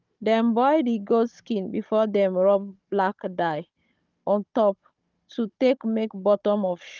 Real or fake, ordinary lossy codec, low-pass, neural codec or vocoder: fake; Opus, 24 kbps; 7.2 kHz; codec, 16 kHz, 16 kbps, FunCodec, trained on Chinese and English, 50 frames a second